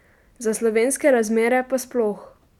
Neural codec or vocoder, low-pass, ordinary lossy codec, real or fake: none; 19.8 kHz; none; real